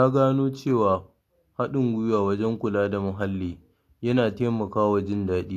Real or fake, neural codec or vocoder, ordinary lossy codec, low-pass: real; none; AAC, 64 kbps; 14.4 kHz